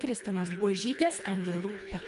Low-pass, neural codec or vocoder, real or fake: 10.8 kHz; codec, 24 kHz, 1.5 kbps, HILCodec; fake